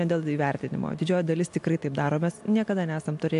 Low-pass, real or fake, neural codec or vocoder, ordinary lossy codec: 10.8 kHz; real; none; AAC, 64 kbps